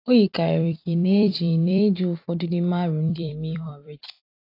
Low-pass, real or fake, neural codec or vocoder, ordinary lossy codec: 5.4 kHz; fake; vocoder, 44.1 kHz, 128 mel bands every 256 samples, BigVGAN v2; AAC, 32 kbps